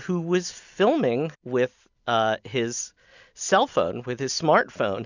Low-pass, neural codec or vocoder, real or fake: 7.2 kHz; none; real